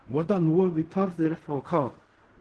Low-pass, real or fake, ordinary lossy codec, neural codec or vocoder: 10.8 kHz; fake; Opus, 16 kbps; codec, 16 kHz in and 24 kHz out, 0.4 kbps, LongCat-Audio-Codec, fine tuned four codebook decoder